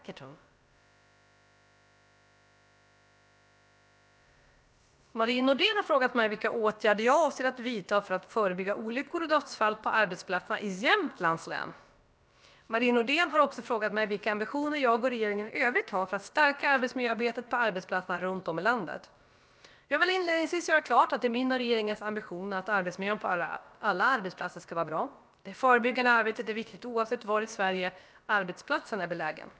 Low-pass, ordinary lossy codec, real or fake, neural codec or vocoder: none; none; fake; codec, 16 kHz, about 1 kbps, DyCAST, with the encoder's durations